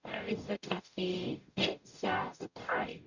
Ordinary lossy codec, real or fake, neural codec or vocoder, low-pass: none; fake; codec, 44.1 kHz, 0.9 kbps, DAC; 7.2 kHz